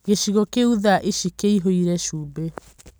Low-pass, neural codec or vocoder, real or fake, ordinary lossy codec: none; none; real; none